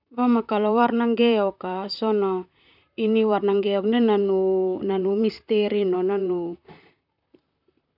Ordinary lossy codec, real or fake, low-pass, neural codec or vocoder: none; fake; 5.4 kHz; vocoder, 44.1 kHz, 128 mel bands, Pupu-Vocoder